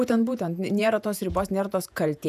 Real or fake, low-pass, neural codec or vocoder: fake; 14.4 kHz; vocoder, 48 kHz, 128 mel bands, Vocos